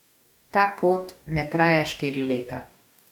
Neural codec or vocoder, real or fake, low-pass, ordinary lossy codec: codec, 44.1 kHz, 2.6 kbps, DAC; fake; 19.8 kHz; none